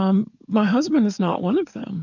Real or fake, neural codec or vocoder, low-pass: fake; codec, 44.1 kHz, 7.8 kbps, DAC; 7.2 kHz